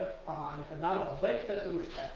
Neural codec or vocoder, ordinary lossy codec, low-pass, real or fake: codec, 16 kHz, 4 kbps, FreqCodec, smaller model; Opus, 32 kbps; 7.2 kHz; fake